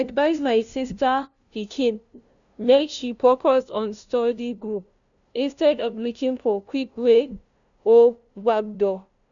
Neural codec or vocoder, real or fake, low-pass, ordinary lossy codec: codec, 16 kHz, 0.5 kbps, FunCodec, trained on LibriTTS, 25 frames a second; fake; 7.2 kHz; none